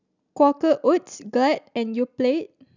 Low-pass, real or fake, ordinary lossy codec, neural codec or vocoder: 7.2 kHz; real; none; none